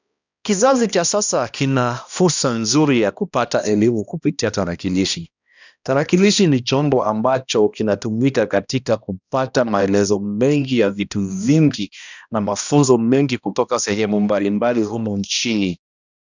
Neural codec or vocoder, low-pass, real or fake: codec, 16 kHz, 1 kbps, X-Codec, HuBERT features, trained on balanced general audio; 7.2 kHz; fake